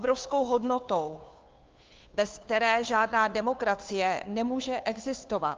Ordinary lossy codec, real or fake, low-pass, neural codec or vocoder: Opus, 24 kbps; fake; 7.2 kHz; codec, 16 kHz, 2 kbps, FunCodec, trained on Chinese and English, 25 frames a second